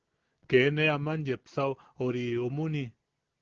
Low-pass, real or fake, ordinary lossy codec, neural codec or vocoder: 7.2 kHz; real; Opus, 16 kbps; none